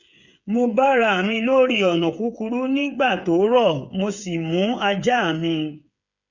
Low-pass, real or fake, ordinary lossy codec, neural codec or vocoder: 7.2 kHz; fake; none; codec, 16 kHz, 8 kbps, FreqCodec, smaller model